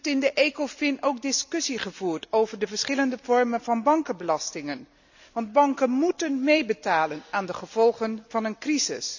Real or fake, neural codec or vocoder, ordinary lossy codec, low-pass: real; none; none; 7.2 kHz